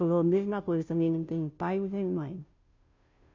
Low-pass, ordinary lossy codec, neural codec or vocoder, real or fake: 7.2 kHz; MP3, 64 kbps; codec, 16 kHz, 0.5 kbps, FunCodec, trained on Chinese and English, 25 frames a second; fake